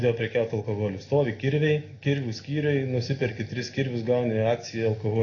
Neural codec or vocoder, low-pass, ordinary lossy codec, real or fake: none; 7.2 kHz; AAC, 32 kbps; real